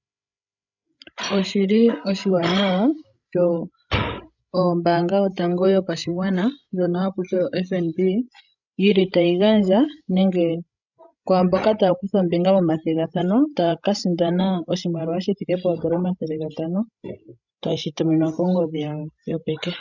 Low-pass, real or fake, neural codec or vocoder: 7.2 kHz; fake; codec, 16 kHz, 16 kbps, FreqCodec, larger model